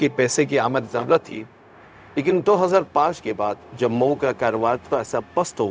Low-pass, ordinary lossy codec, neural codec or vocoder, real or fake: none; none; codec, 16 kHz, 0.4 kbps, LongCat-Audio-Codec; fake